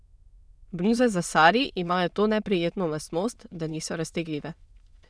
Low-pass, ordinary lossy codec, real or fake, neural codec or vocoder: none; none; fake; autoencoder, 22.05 kHz, a latent of 192 numbers a frame, VITS, trained on many speakers